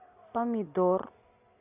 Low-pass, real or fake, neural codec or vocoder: 3.6 kHz; real; none